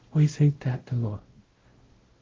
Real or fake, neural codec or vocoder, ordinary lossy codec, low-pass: fake; codec, 16 kHz, 0.5 kbps, X-Codec, WavLM features, trained on Multilingual LibriSpeech; Opus, 16 kbps; 7.2 kHz